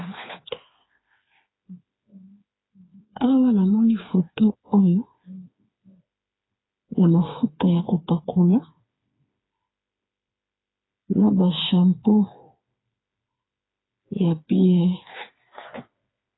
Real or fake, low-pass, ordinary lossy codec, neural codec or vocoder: fake; 7.2 kHz; AAC, 16 kbps; codec, 16 kHz, 4 kbps, FreqCodec, smaller model